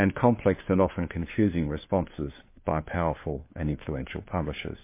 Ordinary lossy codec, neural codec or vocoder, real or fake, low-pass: MP3, 24 kbps; codec, 16 kHz, 4 kbps, FunCodec, trained on LibriTTS, 50 frames a second; fake; 3.6 kHz